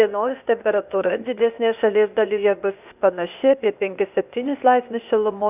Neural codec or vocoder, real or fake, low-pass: codec, 16 kHz, 0.8 kbps, ZipCodec; fake; 3.6 kHz